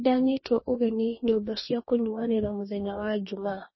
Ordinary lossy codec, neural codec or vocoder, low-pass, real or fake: MP3, 24 kbps; codec, 44.1 kHz, 2.6 kbps, DAC; 7.2 kHz; fake